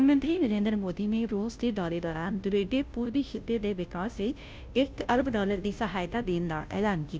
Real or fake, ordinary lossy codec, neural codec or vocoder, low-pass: fake; none; codec, 16 kHz, 0.5 kbps, FunCodec, trained on Chinese and English, 25 frames a second; none